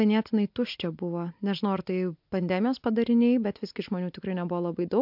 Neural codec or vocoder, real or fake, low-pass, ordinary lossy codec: none; real; 5.4 kHz; MP3, 48 kbps